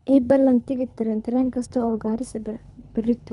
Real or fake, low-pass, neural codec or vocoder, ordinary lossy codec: fake; 10.8 kHz; codec, 24 kHz, 3 kbps, HILCodec; none